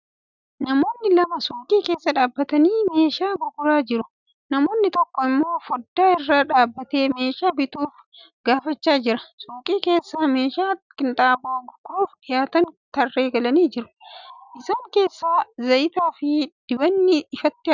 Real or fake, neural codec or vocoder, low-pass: real; none; 7.2 kHz